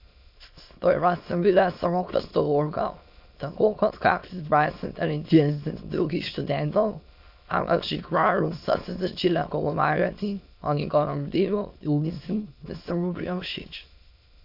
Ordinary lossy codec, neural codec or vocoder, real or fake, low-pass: MP3, 48 kbps; autoencoder, 22.05 kHz, a latent of 192 numbers a frame, VITS, trained on many speakers; fake; 5.4 kHz